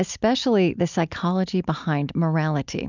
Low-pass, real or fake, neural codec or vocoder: 7.2 kHz; real; none